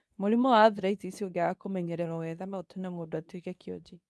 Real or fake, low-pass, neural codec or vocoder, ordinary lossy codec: fake; none; codec, 24 kHz, 0.9 kbps, WavTokenizer, medium speech release version 2; none